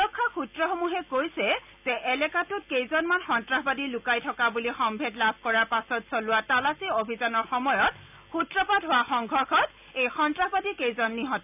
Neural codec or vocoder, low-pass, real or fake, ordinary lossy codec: none; 3.6 kHz; real; none